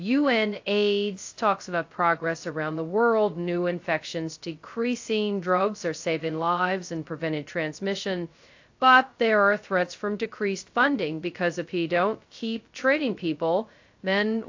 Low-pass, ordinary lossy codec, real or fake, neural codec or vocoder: 7.2 kHz; AAC, 48 kbps; fake; codec, 16 kHz, 0.2 kbps, FocalCodec